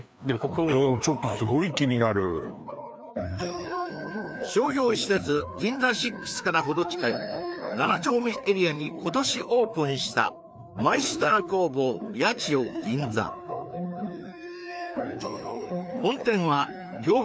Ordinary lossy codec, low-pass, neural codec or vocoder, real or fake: none; none; codec, 16 kHz, 2 kbps, FreqCodec, larger model; fake